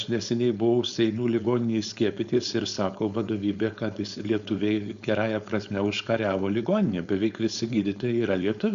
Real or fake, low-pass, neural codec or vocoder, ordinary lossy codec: fake; 7.2 kHz; codec, 16 kHz, 4.8 kbps, FACodec; Opus, 64 kbps